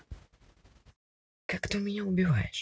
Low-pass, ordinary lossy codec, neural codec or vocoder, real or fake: none; none; none; real